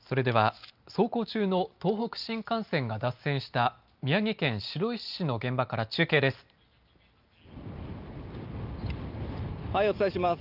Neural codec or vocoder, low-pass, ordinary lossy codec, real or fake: none; 5.4 kHz; Opus, 24 kbps; real